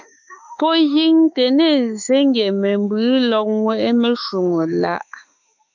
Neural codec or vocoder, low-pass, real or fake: autoencoder, 48 kHz, 32 numbers a frame, DAC-VAE, trained on Japanese speech; 7.2 kHz; fake